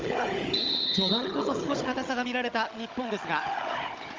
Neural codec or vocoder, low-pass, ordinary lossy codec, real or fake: codec, 16 kHz, 16 kbps, FunCodec, trained on Chinese and English, 50 frames a second; 7.2 kHz; Opus, 24 kbps; fake